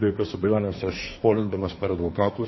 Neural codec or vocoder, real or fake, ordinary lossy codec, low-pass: codec, 24 kHz, 1 kbps, SNAC; fake; MP3, 24 kbps; 7.2 kHz